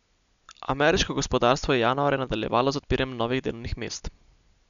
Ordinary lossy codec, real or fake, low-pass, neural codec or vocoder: none; real; 7.2 kHz; none